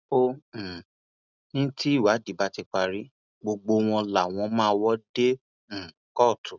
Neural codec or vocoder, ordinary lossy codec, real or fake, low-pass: none; none; real; 7.2 kHz